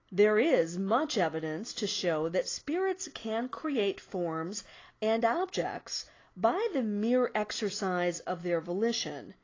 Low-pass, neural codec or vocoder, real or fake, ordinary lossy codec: 7.2 kHz; none; real; AAC, 32 kbps